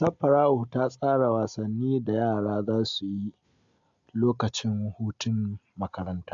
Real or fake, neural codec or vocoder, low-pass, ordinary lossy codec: real; none; 7.2 kHz; AAC, 64 kbps